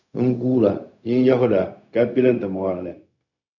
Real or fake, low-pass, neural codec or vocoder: fake; 7.2 kHz; codec, 16 kHz, 0.4 kbps, LongCat-Audio-Codec